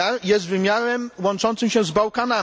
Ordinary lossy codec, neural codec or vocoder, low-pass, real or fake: none; none; none; real